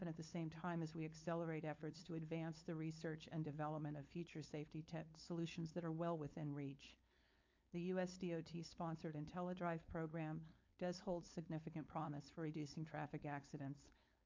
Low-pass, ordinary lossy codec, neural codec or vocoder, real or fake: 7.2 kHz; MP3, 48 kbps; codec, 16 kHz, 4.8 kbps, FACodec; fake